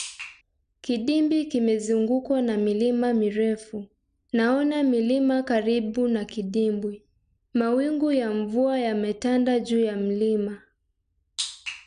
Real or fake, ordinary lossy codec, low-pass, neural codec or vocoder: real; none; 9.9 kHz; none